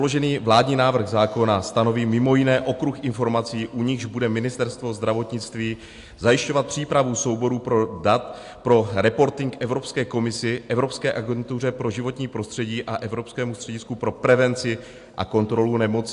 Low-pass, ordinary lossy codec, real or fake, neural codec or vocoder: 10.8 kHz; AAC, 64 kbps; real; none